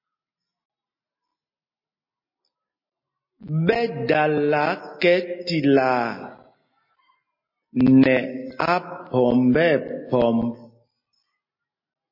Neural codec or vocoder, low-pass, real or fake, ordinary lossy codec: none; 5.4 kHz; real; MP3, 24 kbps